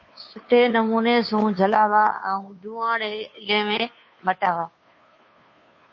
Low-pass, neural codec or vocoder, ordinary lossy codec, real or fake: 7.2 kHz; codec, 16 kHz, 2 kbps, FunCodec, trained on Chinese and English, 25 frames a second; MP3, 32 kbps; fake